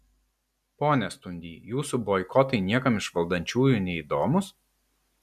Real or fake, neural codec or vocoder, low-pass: real; none; 14.4 kHz